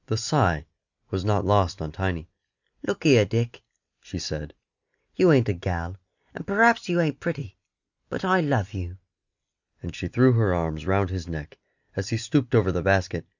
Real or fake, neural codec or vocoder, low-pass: real; none; 7.2 kHz